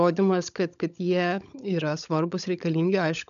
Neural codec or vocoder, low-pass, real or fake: codec, 16 kHz, 16 kbps, FunCodec, trained on LibriTTS, 50 frames a second; 7.2 kHz; fake